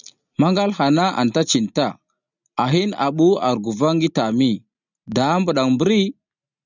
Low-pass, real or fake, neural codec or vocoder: 7.2 kHz; real; none